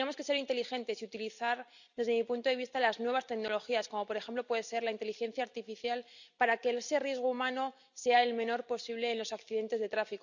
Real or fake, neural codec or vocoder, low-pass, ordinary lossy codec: real; none; 7.2 kHz; none